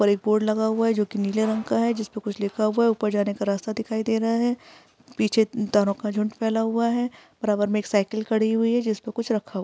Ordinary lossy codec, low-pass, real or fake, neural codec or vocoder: none; none; real; none